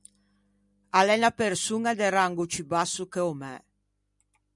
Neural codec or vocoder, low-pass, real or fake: none; 10.8 kHz; real